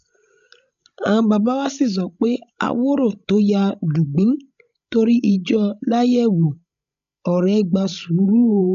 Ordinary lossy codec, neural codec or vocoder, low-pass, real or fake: none; codec, 16 kHz, 16 kbps, FreqCodec, larger model; 7.2 kHz; fake